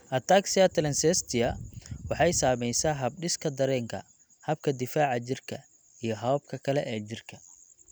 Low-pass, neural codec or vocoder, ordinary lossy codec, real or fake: none; none; none; real